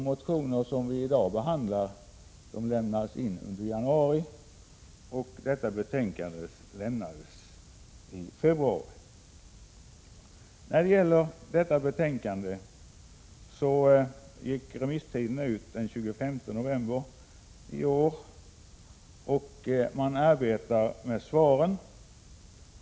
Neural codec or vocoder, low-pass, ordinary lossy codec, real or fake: none; none; none; real